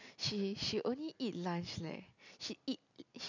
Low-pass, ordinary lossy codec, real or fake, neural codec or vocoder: 7.2 kHz; none; real; none